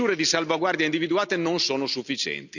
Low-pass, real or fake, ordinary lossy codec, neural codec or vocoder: 7.2 kHz; real; none; none